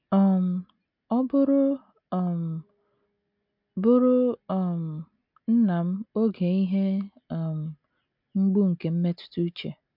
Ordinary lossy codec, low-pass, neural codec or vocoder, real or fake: MP3, 48 kbps; 5.4 kHz; none; real